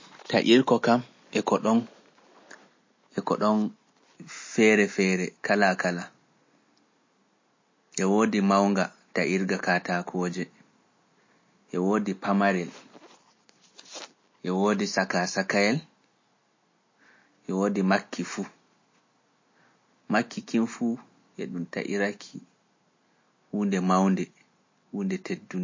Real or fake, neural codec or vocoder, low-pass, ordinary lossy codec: real; none; 7.2 kHz; MP3, 32 kbps